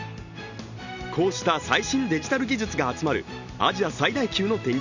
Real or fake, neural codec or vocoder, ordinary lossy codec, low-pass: real; none; none; 7.2 kHz